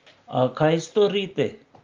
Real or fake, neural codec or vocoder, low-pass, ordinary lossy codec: real; none; 7.2 kHz; Opus, 32 kbps